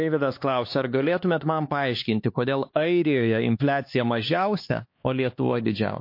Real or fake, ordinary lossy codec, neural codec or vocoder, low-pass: fake; MP3, 32 kbps; codec, 16 kHz, 4 kbps, X-Codec, HuBERT features, trained on LibriSpeech; 5.4 kHz